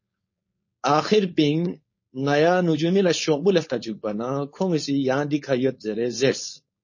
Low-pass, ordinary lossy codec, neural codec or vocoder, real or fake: 7.2 kHz; MP3, 32 kbps; codec, 16 kHz, 4.8 kbps, FACodec; fake